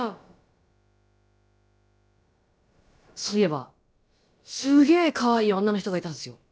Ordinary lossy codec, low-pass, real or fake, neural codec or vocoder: none; none; fake; codec, 16 kHz, about 1 kbps, DyCAST, with the encoder's durations